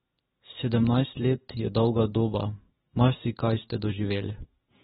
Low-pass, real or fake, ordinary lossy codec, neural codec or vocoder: 7.2 kHz; real; AAC, 16 kbps; none